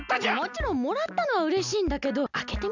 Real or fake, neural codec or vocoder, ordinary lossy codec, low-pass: real; none; none; 7.2 kHz